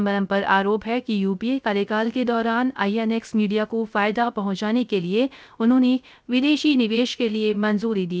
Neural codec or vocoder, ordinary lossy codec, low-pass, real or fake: codec, 16 kHz, 0.3 kbps, FocalCodec; none; none; fake